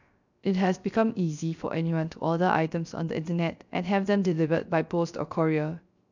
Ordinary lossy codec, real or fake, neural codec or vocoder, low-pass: none; fake; codec, 16 kHz, 0.3 kbps, FocalCodec; 7.2 kHz